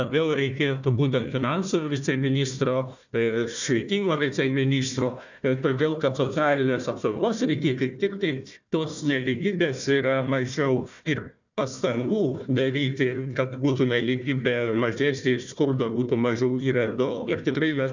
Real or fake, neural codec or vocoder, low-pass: fake; codec, 16 kHz, 1 kbps, FunCodec, trained on Chinese and English, 50 frames a second; 7.2 kHz